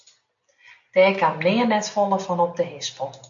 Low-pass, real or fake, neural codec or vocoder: 7.2 kHz; real; none